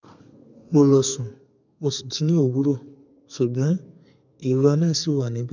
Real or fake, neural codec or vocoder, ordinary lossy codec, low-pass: fake; codec, 32 kHz, 1.9 kbps, SNAC; none; 7.2 kHz